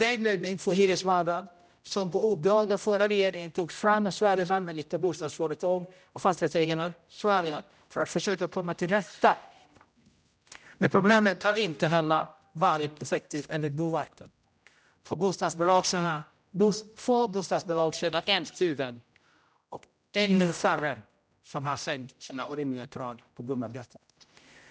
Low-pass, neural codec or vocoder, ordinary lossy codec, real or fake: none; codec, 16 kHz, 0.5 kbps, X-Codec, HuBERT features, trained on general audio; none; fake